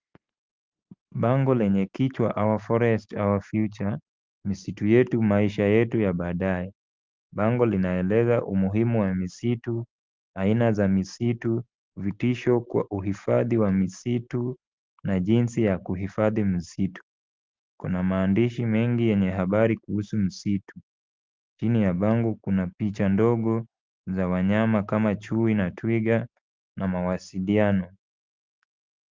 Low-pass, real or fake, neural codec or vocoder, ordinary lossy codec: 7.2 kHz; real; none; Opus, 32 kbps